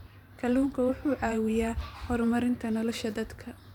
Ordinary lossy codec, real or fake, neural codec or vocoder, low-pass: none; fake; vocoder, 44.1 kHz, 128 mel bands every 512 samples, BigVGAN v2; 19.8 kHz